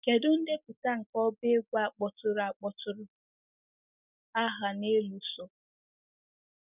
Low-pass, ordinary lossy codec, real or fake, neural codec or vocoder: 3.6 kHz; none; real; none